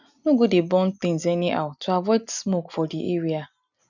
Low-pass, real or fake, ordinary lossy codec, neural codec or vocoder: 7.2 kHz; real; none; none